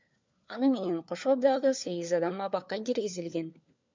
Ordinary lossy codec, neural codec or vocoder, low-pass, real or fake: MP3, 64 kbps; codec, 16 kHz, 4 kbps, FunCodec, trained on LibriTTS, 50 frames a second; 7.2 kHz; fake